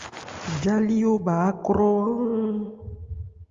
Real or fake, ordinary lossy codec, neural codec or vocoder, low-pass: real; Opus, 32 kbps; none; 7.2 kHz